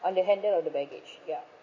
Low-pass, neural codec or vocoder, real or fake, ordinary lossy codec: 7.2 kHz; none; real; MP3, 32 kbps